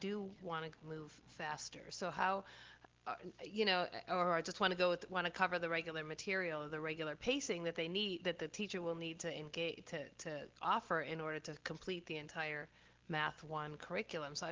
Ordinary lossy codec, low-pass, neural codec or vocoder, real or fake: Opus, 16 kbps; 7.2 kHz; none; real